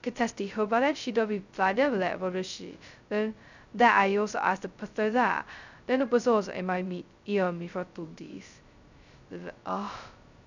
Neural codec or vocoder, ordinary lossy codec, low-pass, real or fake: codec, 16 kHz, 0.2 kbps, FocalCodec; none; 7.2 kHz; fake